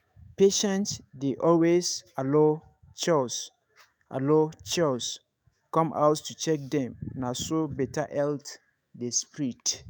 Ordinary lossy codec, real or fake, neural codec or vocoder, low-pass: none; fake; autoencoder, 48 kHz, 128 numbers a frame, DAC-VAE, trained on Japanese speech; none